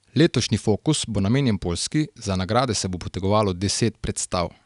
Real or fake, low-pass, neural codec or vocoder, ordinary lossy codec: real; 10.8 kHz; none; none